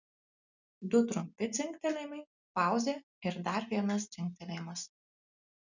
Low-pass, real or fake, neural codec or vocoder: 7.2 kHz; real; none